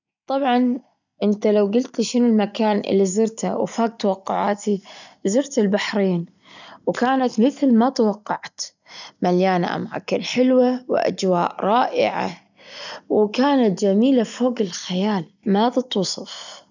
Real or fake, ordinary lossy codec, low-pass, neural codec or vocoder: real; none; 7.2 kHz; none